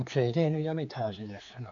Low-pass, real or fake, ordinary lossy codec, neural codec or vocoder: 7.2 kHz; fake; none; codec, 16 kHz, 4 kbps, X-Codec, HuBERT features, trained on general audio